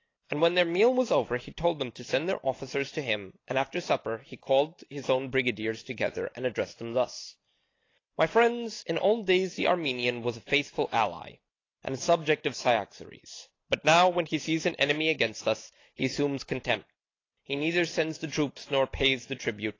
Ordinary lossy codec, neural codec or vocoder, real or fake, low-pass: AAC, 32 kbps; none; real; 7.2 kHz